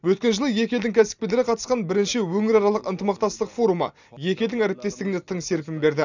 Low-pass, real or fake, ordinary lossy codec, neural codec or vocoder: 7.2 kHz; real; none; none